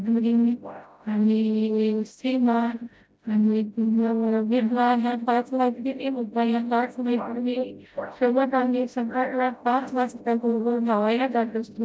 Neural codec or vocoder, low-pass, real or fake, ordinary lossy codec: codec, 16 kHz, 0.5 kbps, FreqCodec, smaller model; none; fake; none